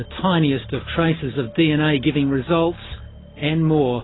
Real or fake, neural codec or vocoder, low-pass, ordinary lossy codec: real; none; 7.2 kHz; AAC, 16 kbps